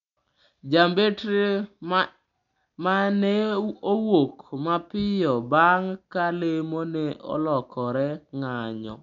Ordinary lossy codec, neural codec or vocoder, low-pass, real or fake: none; none; 7.2 kHz; real